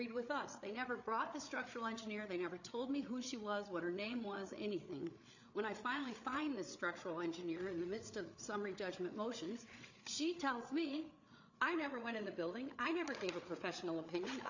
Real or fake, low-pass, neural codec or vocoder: fake; 7.2 kHz; codec, 16 kHz, 8 kbps, FreqCodec, larger model